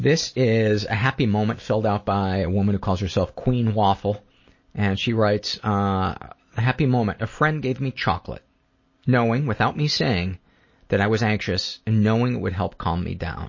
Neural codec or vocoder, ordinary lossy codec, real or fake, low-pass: none; MP3, 32 kbps; real; 7.2 kHz